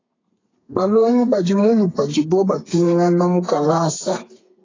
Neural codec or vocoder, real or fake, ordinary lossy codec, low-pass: codec, 32 kHz, 1.9 kbps, SNAC; fake; AAC, 32 kbps; 7.2 kHz